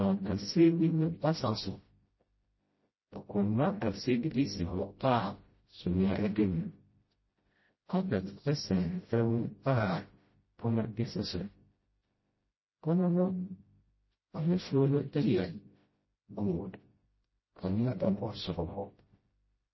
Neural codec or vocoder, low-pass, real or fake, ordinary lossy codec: codec, 16 kHz, 0.5 kbps, FreqCodec, smaller model; 7.2 kHz; fake; MP3, 24 kbps